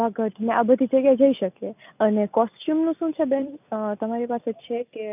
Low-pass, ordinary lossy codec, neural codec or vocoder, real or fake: 3.6 kHz; none; none; real